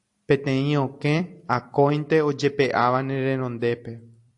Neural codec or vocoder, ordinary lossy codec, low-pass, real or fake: vocoder, 44.1 kHz, 128 mel bands every 256 samples, BigVGAN v2; AAC, 64 kbps; 10.8 kHz; fake